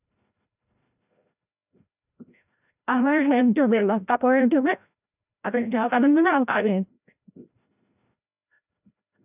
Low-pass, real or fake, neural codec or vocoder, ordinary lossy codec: 3.6 kHz; fake; codec, 16 kHz, 0.5 kbps, FreqCodec, larger model; none